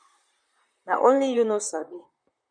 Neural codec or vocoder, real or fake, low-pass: vocoder, 44.1 kHz, 128 mel bands, Pupu-Vocoder; fake; 9.9 kHz